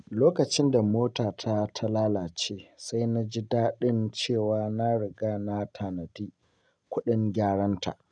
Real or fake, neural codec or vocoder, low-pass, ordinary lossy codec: real; none; 9.9 kHz; none